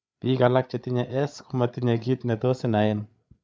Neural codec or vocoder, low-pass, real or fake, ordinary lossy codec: codec, 16 kHz, 8 kbps, FreqCodec, larger model; none; fake; none